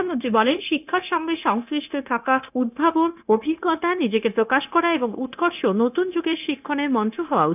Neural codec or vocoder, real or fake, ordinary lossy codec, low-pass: codec, 16 kHz, 0.9 kbps, LongCat-Audio-Codec; fake; none; 3.6 kHz